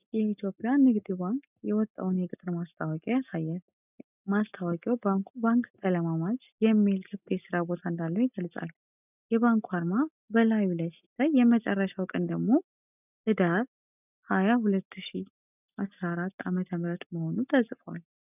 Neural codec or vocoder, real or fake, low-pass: none; real; 3.6 kHz